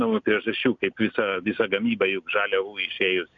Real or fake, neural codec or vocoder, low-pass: real; none; 7.2 kHz